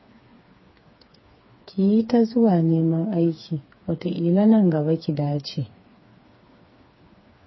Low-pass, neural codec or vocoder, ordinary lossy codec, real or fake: 7.2 kHz; codec, 16 kHz, 4 kbps, FreqCodec, smaller model; MP3, 24 kbps; fake